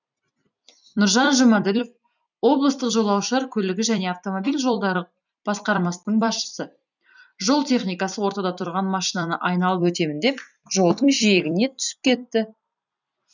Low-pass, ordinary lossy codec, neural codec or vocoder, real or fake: 7.2 kHz; none; vocoder, 44.1 kHz, 128 mel bands every 256 samples, BigVGAN v2; fake